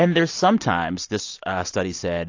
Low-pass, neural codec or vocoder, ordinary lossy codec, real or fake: 7.2 kHz; none; AAC, 48 kbps; real